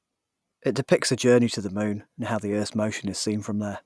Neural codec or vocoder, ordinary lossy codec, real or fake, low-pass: none; none; real; none